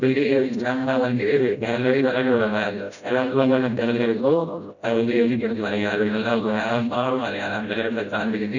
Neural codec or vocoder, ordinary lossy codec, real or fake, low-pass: codec, 16 kHz, 0.5 kbps, FreqCodec, smaller model; none; fake; 7.2 kHz